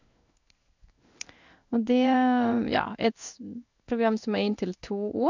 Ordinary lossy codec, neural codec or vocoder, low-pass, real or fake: none; codec, 16 kHz in and 24 kHz out, 1 kbps, XY-Tokenizer; 7.2 kHz; fake